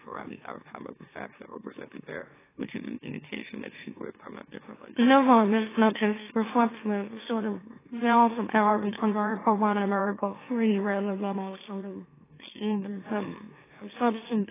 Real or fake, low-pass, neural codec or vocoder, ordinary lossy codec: fake; 3.6 kHz; autoencoder, 44.1 kHz, a latent of 192 numbers a frame, MeloTTS; AAC, 16 kbps